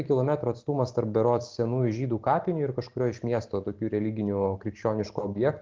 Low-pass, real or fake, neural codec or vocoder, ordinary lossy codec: 7.2 kHz; real; none; Opus, 16 kbps